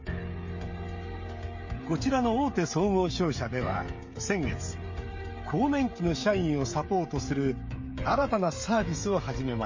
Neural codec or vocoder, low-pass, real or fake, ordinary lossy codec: codec, 16 kHz, 16 kbps, FreqCodec, smaller model; 7.2 kHz; fake; MP3, 32 kbps